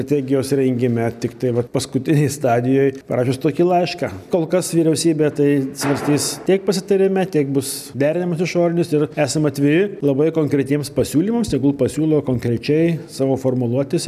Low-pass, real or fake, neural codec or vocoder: 14.4 kHz; real; none